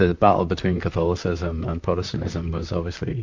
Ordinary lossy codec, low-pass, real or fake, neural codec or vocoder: MP3, 64 kbps; 7.2 kHz; fake; vocoder, 44.1 kHz, 128 mel bands, Pupu-Vocoder